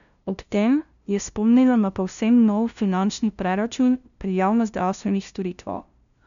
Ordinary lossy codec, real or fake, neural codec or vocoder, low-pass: none; fake; codec, 16 kHz, 0.5 kbps, FunCodec, trained on LibriTTS, 25 frames a second; 7.2 kHz